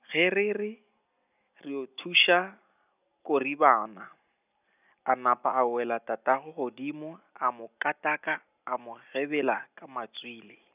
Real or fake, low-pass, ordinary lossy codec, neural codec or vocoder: real; 3.6 kHz; none; none